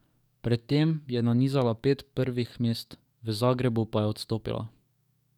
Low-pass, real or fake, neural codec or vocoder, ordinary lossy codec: 19.8 kHz; fake; codec, 44.1 kHz, 7.8 kbps, DAC; none